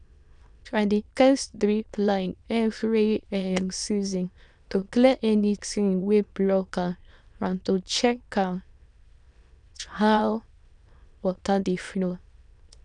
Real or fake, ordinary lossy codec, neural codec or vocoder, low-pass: fake; none; autoencoder, 22.05 kHz, a latent of 192 numbers a frame, VITS, trained on many speakers; 9.9 kHz